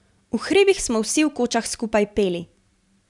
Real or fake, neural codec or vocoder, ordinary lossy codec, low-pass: real; none; none; 10.8 kHz